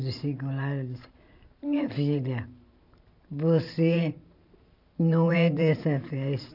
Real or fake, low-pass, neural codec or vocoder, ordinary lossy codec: fake; 5.4 kHz; vocoder, 44.1 kHz, 128 mel bands every 512 samples, BigVGAN v2; none